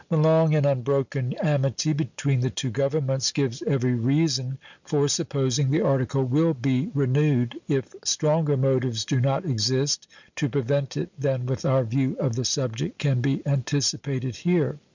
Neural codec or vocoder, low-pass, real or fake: none; 7.2 kHz; real